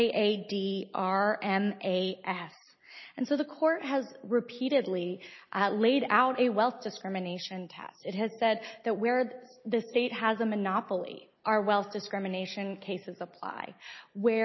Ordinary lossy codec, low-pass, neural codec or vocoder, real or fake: MP3, 24 kbps; 7.2 kHz; none; real